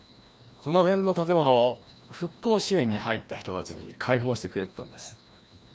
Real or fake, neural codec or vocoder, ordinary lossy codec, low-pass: fake; codec, 16 kHz, 1 kbps, FreqCodec, larger model; none; none